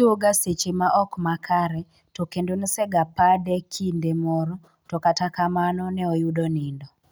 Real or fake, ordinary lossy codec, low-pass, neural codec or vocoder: real; none; none; none